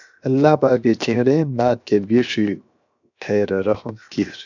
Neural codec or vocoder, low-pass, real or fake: codec, 16 kHz, 0.7 kbps, FocalCodec; 7.2 kHz; fake